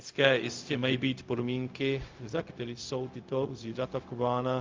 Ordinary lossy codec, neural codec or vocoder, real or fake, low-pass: Opus, 24 kbps; codec, 16 kHz, 0.4 kbps, LongCat-Audio-Codec; fake; 7.2 kHz